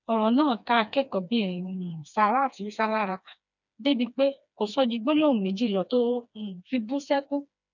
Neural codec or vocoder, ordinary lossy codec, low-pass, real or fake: codec, 16 kHz, 2 kbps, FreqCodec, smaller model; none; 7.2 kHz; fake